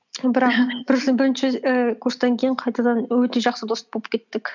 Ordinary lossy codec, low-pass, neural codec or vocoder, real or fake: none; 7.2 kHz; none; real